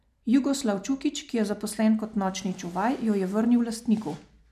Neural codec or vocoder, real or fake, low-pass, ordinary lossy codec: none; real; 14.4 kHz; none